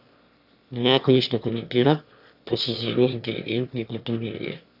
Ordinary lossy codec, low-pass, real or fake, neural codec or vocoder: Opus, 64 kbps; 5.4 kHz; fake; autoencoder, 22.05 kHz, a latent of 192 numbers a frame, VITS, trained on one speaker